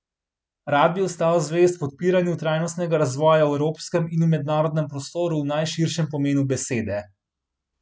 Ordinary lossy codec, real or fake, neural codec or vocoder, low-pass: none; real; none; none